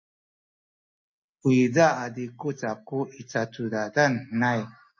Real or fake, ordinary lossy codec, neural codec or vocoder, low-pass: real; MP3, 32 kbps; none; 7.2 kHz